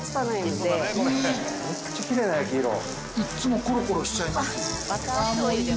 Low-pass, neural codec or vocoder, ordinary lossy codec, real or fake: none; none; none; real